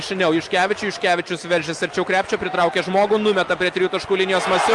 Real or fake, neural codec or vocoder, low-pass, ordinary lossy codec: real; none; 10.8 kHz; Opus, 32 kbps